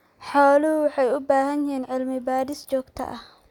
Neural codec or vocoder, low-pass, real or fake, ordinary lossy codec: none; 19.8 kHz; real; none